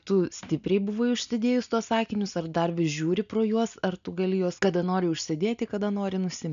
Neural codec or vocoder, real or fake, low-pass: none; real; 7.2 kHz